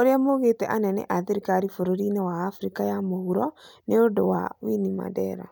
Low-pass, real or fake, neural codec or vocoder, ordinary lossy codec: none; real; none; none